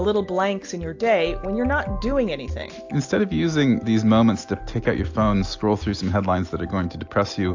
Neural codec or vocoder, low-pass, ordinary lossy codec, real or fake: none; 7.2 kHz; AAC, 48 kbps; real